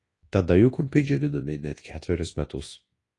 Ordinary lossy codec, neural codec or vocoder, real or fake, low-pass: AAC, 32 kbps; codec, 24 kHz, 0.9 kbps, WavTokenizer, large speech release; fake; 10.8 kHz